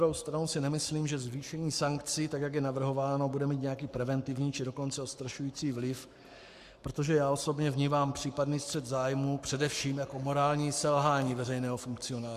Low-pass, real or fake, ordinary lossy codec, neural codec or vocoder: 14.4 kHz; fake; Opus, 64 kbps; codec, 44.1 kHz, 7.8 kbps, Pupu-Codec